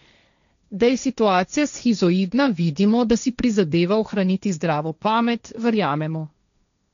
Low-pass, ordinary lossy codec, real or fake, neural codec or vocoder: 7.2 kHz; none; fake; codec, 16 kHz, 1.1 kbps, Voila-Tokenizer